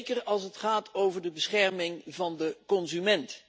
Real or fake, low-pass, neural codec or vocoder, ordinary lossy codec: real; none; none; none